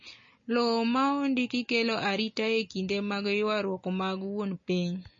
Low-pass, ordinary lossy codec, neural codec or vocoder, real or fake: 7.2 kHz; MP3, 32 kbps; none; real